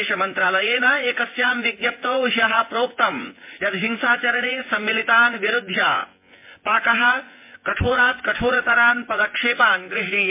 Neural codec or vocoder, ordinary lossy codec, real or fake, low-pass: vocoder, 24 kHz, 100 mel bands, Vocos; MP3, 24 kbps; fake; 3.6 kHz